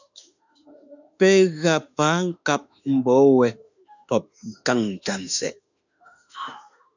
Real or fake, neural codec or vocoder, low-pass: fake; autoencoder, 48 kHz, 32 numbers a frame, DAC-VAE, trained on Japanese speech; 7.2 kHz